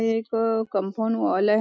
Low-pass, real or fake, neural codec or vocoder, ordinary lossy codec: none; real; none; none